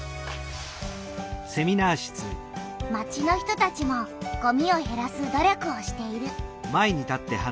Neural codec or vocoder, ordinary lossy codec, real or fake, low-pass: none; none; real; none